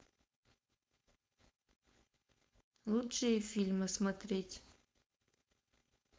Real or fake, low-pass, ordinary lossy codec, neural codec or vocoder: fake; none; none; codec, 16 kHz, 4.8 kbps, FACodec